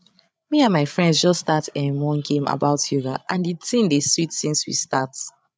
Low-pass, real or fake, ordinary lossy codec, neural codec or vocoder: none; fake; none; codec, 16 kHz, 8 kbps, FreqCodec, larger model